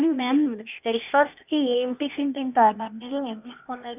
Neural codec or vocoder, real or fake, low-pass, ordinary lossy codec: codec, 16 kHz, 0.8 kbps, ZipCodec; fake; 3.6 kHz; none